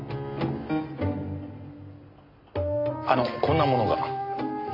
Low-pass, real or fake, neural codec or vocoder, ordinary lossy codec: 5.4 kHz; real; none; none